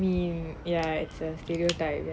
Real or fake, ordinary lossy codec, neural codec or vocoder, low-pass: real; none; none; none